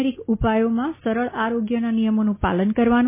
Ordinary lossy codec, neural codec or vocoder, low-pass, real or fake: MP3, 16 kbps; none; 3.6 kHz; real